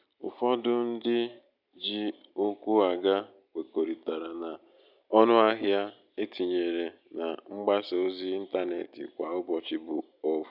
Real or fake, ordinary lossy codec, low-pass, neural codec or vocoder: real; none; 5.4 kHz; none